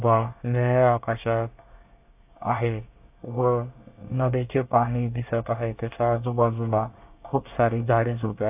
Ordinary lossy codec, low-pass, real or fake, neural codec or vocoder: AAC, 32 kbps; 3.6 kHz; fake; codec, 24 kHz, 1 kbps, SNAC